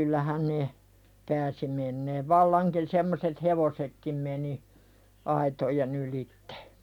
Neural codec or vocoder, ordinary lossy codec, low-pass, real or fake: none; none; 19.8 kHz; real